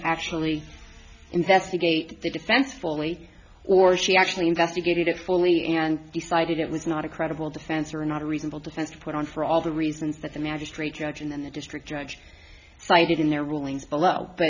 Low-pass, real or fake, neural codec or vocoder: 7.2 kHz; real; none